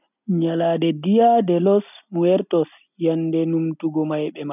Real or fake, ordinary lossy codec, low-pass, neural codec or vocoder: real; none; 3.6 kHz; none